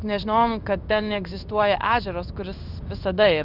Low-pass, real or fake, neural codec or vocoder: 5.4 kHz; fake; codec, 16 kHz in and 24 kHz out, 1 kbps, XY-Tokenizer